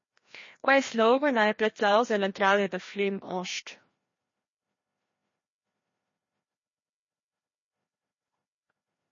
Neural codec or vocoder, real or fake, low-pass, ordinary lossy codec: codec, 16 kHz, 1 kbps, FreqCodec, larger model; fake; 7.2 kHz; MP3, 32 kbps